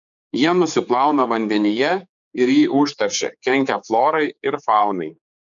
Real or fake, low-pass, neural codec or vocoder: fake; 7.2 kHz; codec, 16 kHz, 4 kbps, X-Codec, HuBERT features, trained on general audio